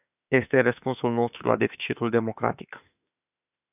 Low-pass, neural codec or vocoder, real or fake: 3.6 kHz; autoencoder, 48 kHz, 32 numbers a frame, DAC-VAE, trained on Japanese speech; fake